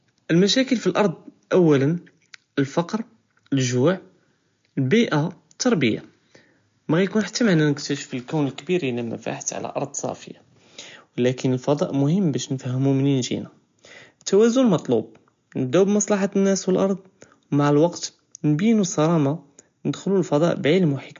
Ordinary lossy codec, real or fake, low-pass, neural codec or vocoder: MP3, 48 kbps; real; 7.2 kHz; none